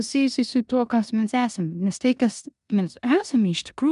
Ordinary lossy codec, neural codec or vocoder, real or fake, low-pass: MP3, 96 kbps; codec, 16 kHz in and 24 kHz out, 0.9 kbps, LongCat-Audio-Codec, four codebook decoder; fake; 10.8 kHz